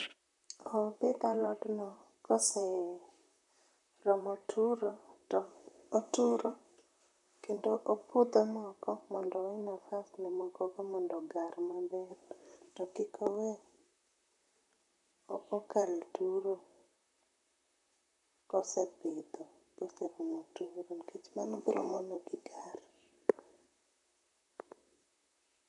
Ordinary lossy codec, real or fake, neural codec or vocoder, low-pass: none; fake; vocoder, 44.1 kHz, 128 mel bands, Pupu-Vocoder; 10.8 kHz